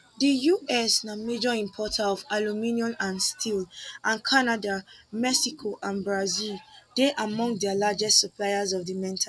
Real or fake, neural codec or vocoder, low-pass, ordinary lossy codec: real; none; none; none